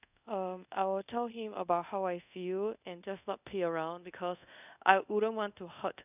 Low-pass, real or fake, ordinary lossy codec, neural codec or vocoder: 3.6 kHz; fake; none; codec, 24 kHz, 0.5 kbps, DualCodec